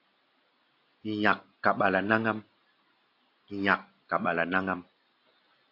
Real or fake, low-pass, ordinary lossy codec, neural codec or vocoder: real; 5.4 kHz; AAC, 32 kbps; none